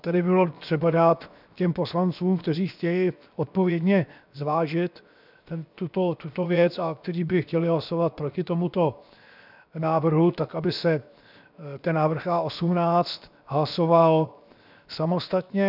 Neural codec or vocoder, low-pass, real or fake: codec, 16 kHz, 0.7 kbps, FocalCodec; 5.4 kHz; fake